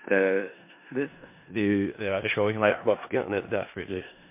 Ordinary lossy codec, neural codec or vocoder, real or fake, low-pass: MP3, 24 kbps; codec, 16 kHz in and 24 kHz out, 0.4 kbps, LongCat-Audio-Codec, four codebook decoder; fake; 3.6 kHz